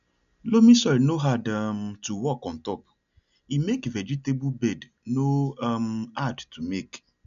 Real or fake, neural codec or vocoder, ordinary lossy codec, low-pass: real; none; none; 7.2 kHz